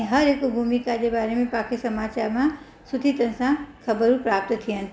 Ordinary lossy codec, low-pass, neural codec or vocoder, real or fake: none; none; none; real